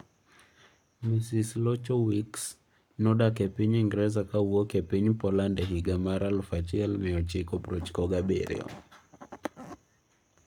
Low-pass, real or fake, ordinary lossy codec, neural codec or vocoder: 19.8 kHz; fake; none; codec, 44.1 kHz, 7.8 kbps, Pupu-Codec